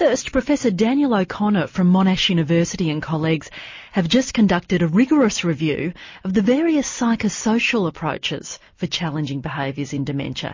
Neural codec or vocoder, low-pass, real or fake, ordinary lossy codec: none; 7.2 kHz; real; MP3, 32 kbps